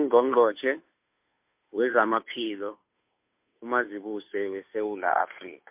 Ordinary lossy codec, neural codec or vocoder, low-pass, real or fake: MP3, 32 kbps; codec, 16 kHz, 2 kbps, FunCodec, trained on Chinese and English, 25 frames a second; 3.6 kHz; fake